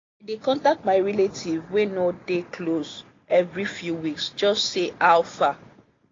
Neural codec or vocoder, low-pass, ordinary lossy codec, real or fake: none; 7.2 kHz; AAC, 32 kbps; real